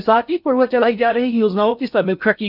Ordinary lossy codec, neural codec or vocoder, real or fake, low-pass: none; codec, 16 kHz in and 24 kHz out, 0.6 kbps, FocalCodec, streaming, 4096 codes; fake; 5.4 kHz